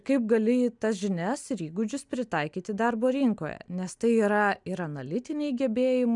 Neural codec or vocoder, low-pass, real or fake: none; 10.8 kHz; real